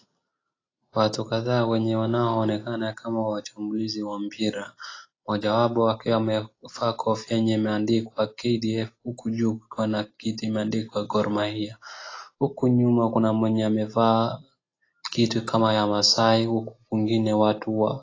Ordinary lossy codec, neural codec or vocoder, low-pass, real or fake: AAC, 32 kbps; none; 7.2 kHz; real